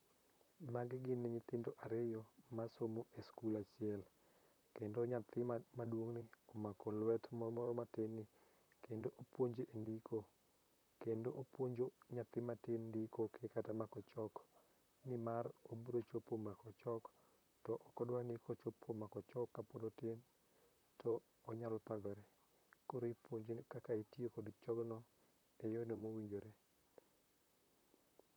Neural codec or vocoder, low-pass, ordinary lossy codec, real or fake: vocoder, 44.1 kHz, 128 mel bands, Pupu-Vocoder; none; none; fake